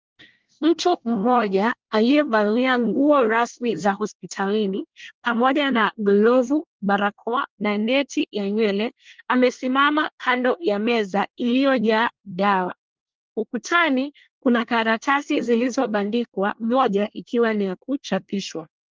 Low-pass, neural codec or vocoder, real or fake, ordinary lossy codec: 7.2 kHz; codec, 24 kHz, 1 kbps, SNAC; fake; Opus, 32 kbps